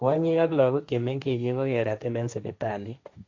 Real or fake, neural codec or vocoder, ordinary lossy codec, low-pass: fake; codec, 16 kHz, 1.1 kbps, Voila-Tokenizer; AAC, 48 kbps; 7.2 kHz